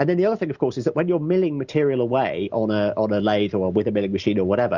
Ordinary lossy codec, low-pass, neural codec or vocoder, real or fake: Opus, 64 kbps; 7.2 kHz; none; real